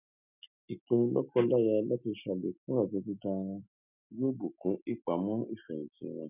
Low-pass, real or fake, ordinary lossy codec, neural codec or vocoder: 3.6 kHz; real; none; none